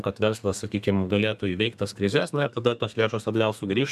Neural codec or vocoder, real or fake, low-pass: codec, 32 kHz, 1.9 kbps, SNAC; fake; 14.4 kHz